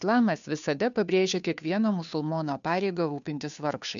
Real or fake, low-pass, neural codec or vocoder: fake; 7.2 kHz; codec, 16 kHz, 2 kbps, FunCodec, trained on Chinese and English, 25 frames a second